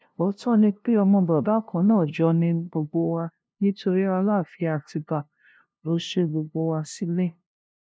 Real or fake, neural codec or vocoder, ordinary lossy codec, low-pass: fake; codec, 16 kHz, 0.5 kbps, FunCodec, trained on LibriTTS, 25 frames a second; none; none